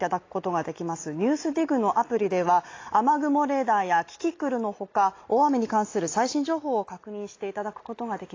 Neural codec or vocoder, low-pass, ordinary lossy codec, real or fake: none; 7.2 kHz; AAC, 32 kbps; real